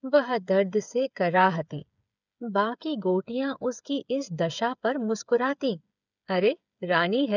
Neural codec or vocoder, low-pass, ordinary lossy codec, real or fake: codec, 16 kHz, 8 kbps, FreqCodec, smaller model; 7.2 kHz; none; fake